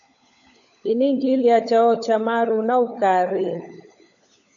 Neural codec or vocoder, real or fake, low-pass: codec, 16 kHz, 16 kbps, FunCodec, trained on LibriTTS, 50 frames a second; fake; 7.2 kHz